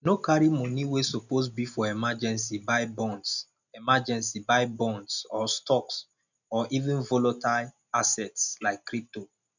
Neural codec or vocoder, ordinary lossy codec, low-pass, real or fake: none; none; 7.2 kHz; real